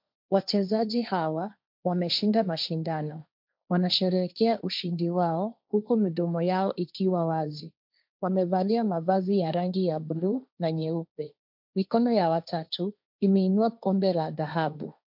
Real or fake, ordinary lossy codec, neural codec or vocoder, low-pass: fake; MP3, 48 kbps; codec, 16 kHz, 1.1 kbps, Voila-Tokenizer; 5.4 kHz